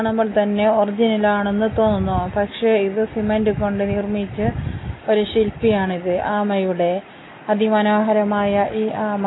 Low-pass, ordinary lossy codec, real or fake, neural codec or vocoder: 7.2 kHz; AAC, 16 kbps; real; none